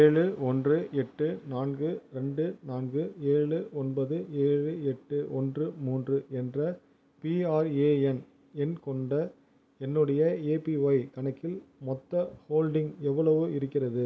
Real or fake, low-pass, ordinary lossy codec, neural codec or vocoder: real; none; none; none